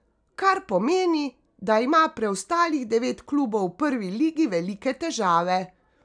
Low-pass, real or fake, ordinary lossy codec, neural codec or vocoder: 9.9 kHz; real; none; none